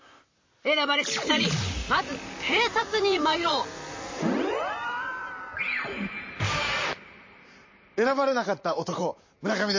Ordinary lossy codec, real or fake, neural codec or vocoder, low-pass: MP3, 32 kbps; fake; vocoder, 44.1 kHz, 128 mel bands, Pupu-Vocoder; 7.2 kHz